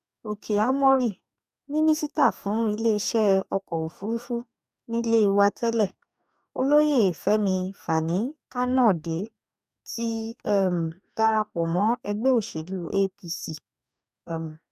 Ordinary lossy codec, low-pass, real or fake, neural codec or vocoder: none; 14.4 kHz; fake; codec, 44.1 kHz, 2.6 kbps, DAC